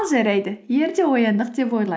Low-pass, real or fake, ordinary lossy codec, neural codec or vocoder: none; real; none; none